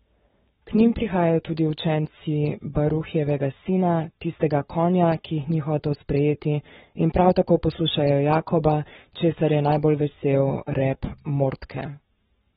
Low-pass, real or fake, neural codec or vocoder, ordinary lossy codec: 19.8 kHz; fake; codec, 44.1 kHz, 7.8 kbps, Pupu-Codec; AAC, 16 kbps